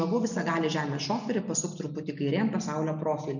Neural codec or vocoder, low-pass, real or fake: none; 7.2 kHz; real